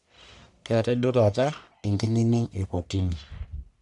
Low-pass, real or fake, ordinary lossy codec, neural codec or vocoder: 10.8 kHz; fake; none; codec, 44.1 kHz, 1.7 kbps, Pupu-Codec